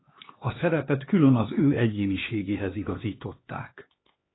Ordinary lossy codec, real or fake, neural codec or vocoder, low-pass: AAC, 16 kbps; fake; codec, 16 kHz, 2 kbps, X-Codec, HuBERT features, trained on LibriSpeech; 7.2 kHz